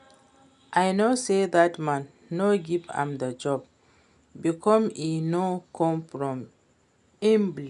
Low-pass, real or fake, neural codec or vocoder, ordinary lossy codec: 10.8 kHz; real; none; none